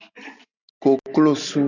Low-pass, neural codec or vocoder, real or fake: 7.2 kHz; none; real